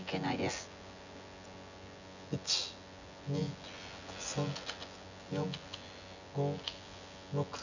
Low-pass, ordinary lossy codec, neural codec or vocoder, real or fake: 7.2 kHz; none; vocoder, 24 kHz, 100 mel bands, Vocos; fake